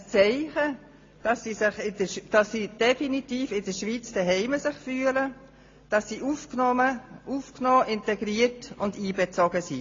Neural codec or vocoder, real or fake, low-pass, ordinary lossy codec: none; real; 7.2 kHz; AAC, 32 kbps